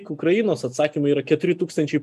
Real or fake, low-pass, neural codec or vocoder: real; 14.4 kHz; none